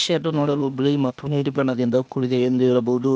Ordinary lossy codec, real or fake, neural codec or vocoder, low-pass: none; fake; codec, 16 kHz, 0.8 kbps, ZipCodec; none